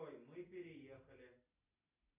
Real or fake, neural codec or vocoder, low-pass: real; none; 3.6 kHz